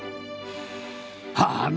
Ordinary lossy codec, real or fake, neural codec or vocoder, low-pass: none; real; none; none